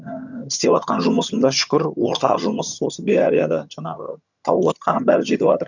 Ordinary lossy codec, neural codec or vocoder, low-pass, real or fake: none; vocoder, 22.05 kHz, 80 mel bands, HiFi-GAN; 7.2 kHz; fake